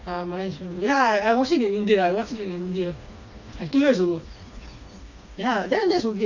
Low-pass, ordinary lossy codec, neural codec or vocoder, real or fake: 7.2 kHz; none; codec, 16 kHz, 2 kbps, FreqCodec, smaller model; fake